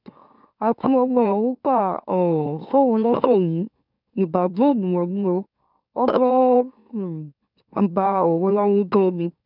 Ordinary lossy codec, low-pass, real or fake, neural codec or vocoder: none; 5.4 kHz; fake; autoencoder, 44.1 kHz, a latent of 192 numbers a frame, MeloTTS